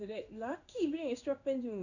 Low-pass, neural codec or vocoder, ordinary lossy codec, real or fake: 7.2 kHz; codec, 16 kHz in and 24 kHz out, 1 kbps, XY-Tokenizer; none; fake